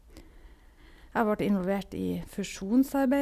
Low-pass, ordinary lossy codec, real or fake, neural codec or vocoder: 14.4 kHz; Opus, 64 kbps; real; none